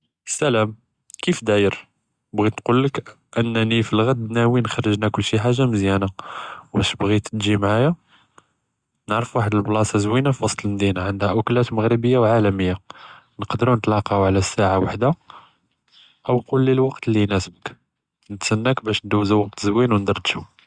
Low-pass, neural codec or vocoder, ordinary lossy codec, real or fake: 9.9 kHz; none; Opus, 64 kbps; real